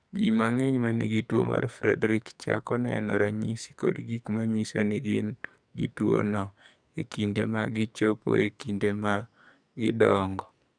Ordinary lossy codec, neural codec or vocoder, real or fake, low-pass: none; codec, 44.1 kHz, 2.6 kbps, SNAC; fake; 9.9 kHz